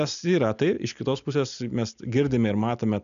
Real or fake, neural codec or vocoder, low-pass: real; none; 7.2 kHz